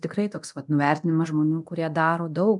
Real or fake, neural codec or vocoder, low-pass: fake; codec, 24 kHz, 0.9 kbps, DualCodec; 10.8 kHz